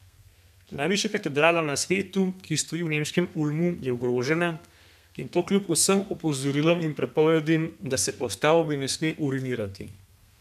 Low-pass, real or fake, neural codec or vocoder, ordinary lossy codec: 14.4 kHz; fake; codec, 32 kHz, 1.9 kbps, SNAC; none